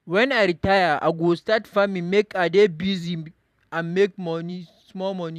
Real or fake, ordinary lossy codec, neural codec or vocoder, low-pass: fake; none; vocoder, 44.1 kHz, 128 mel bands every 512 samples, BigVGAN v2; 14.4 kHz